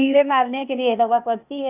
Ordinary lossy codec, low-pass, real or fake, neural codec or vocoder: none; 3.6 kHz; fake; codec, 16 kHz, 0.8 kbps, ZipCodec